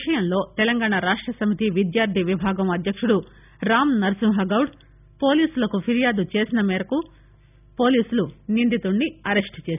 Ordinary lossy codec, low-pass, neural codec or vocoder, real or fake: none; 3.6 kHz; none; real